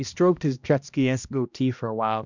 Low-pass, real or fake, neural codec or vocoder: 7.2 kHz; fake; codec, 16 kHz, 1 kbps, X-Codec, HuBERT features, trained on balanced general audio